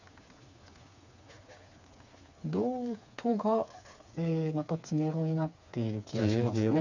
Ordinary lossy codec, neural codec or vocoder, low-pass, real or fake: none; codec, 16 kHz, 4 kbps, FreqCodec, smaller model; 7.2 kHz; fake